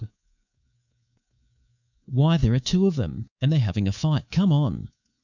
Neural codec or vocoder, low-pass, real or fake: codec, 24 kHz, 3.1 kbps, DualCodec; 7.2 kHz; fake